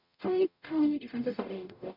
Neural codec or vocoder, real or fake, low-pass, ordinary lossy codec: codec, 44.1 kHz, 0.9 kbps, DAC; fake; 5.4 kHz; none